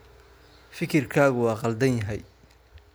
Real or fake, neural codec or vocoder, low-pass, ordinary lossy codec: real; none; none; none